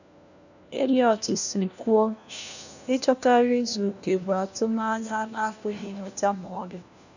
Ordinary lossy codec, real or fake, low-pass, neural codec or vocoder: none; fake; 7.2 kHz; codec, 16 kHz, 1 kbps, FunCodec, trained on LibriTTS, 50 frames a second